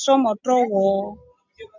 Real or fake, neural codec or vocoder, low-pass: real; none; 7.2 kHz